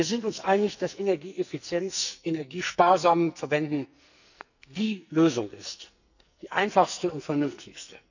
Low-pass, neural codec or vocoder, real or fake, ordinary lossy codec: 7.2 kHz; codec, 44.1 kHz, 2.6 kbps, SNAC; fake; none